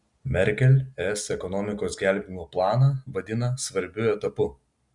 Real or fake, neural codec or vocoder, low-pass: real; none; 10.8 kHz